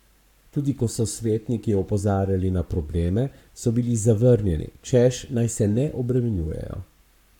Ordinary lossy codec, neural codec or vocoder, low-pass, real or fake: none; codec, 44.1 kHz, 7.8 kbps, Pupu-Codec; 19.8 kHz; fake